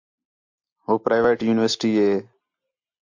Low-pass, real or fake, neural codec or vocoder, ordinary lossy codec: 7.2 kHz; real; none; MP3, 48 kbps